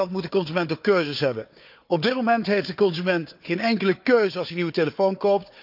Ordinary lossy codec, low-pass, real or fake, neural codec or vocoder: none; 5.4 kHz; fake; codec, 16 kHz, 4 kbps, FunCodec, trained on Chinese and English, 50 frames a second